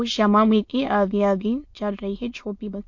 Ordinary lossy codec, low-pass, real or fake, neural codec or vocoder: MP3, 48 kbps; 7.2 kHz; fake; autoencoder, 22.05 kHz, a latent of 192 numbers a frame, VITS, trained on many speakers